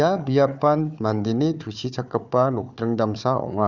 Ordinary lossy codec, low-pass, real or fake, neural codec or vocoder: none; 7.2 kHz; fake; codec, 16 kHz, 4 kbps, FunCodec, trained on Chinese and English, 50 frames a second